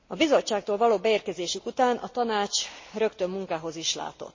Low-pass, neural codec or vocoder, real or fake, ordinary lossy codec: 7.2 kHz; none; real; MP3, 32 kbps